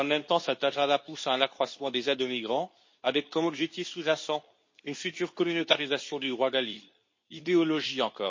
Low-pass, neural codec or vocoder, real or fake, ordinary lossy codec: 7.2 kHz; codec, 24 kHz, 0.9 kbps, WavTokenizer, medium speech release version 2; fake; MP3, 32 kbps